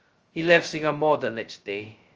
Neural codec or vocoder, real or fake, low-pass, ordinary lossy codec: codec, 16 kHz, 0.2 kbps, FocalCodec; fake; 7.2 kHz; Opus, 24 kbps